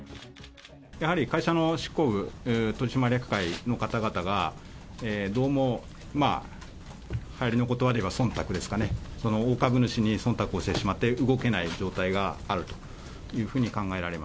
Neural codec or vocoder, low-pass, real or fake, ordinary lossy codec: none; none; real; none